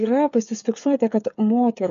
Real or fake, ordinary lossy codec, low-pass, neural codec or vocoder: fake; MP3, 64 kbps; 7.2 kHz; codec, 16 kHz, 8 kbps, FreqCodec, smaller model